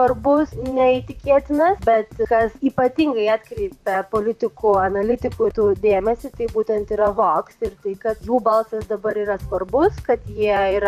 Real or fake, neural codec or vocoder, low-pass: fake; vocoder, 44.1 kHz, 128 mel bands, Pupu-Vocoder; 14.4 kHz